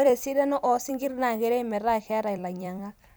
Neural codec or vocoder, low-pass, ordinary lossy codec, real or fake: vocoder, 44.1 kHz, 128 mel bands every 256 samples, BigVGAN v2; none; none; fake